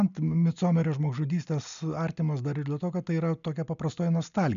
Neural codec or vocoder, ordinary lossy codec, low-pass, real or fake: none; MP3, 96 kbps; 7.2 kHz; real